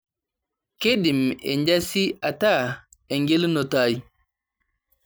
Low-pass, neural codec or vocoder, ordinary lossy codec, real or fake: none; none; none; real